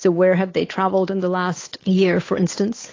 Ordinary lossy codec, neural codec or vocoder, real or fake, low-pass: AAC, 32 kbps; codec, 16 kHz, 8 kbps, FunCodec, trained on Chinese and English, 25 frames a second; fake; 7.2 kHz